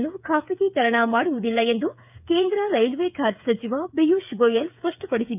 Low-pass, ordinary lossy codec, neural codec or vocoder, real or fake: 3.6 kHz; none; codec, 16 kHz, 8 kbps, FreqCodec, smaller model; fake